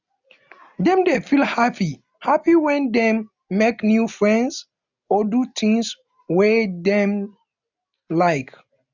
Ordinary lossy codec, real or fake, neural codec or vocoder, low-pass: none; real; none; 7.2 kHz